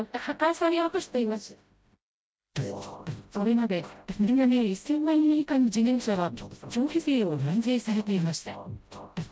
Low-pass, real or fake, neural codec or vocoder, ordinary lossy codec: none; fake; codec, 16 kHz, 0.5 kbps, FreqCodec, smaller model; none